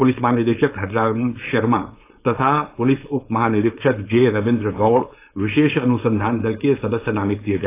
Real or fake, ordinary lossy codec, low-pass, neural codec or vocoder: fake; AAC, 24 kbps; 3.6 kHz; codec, 16 kHz, 4.8 kbps, FACodec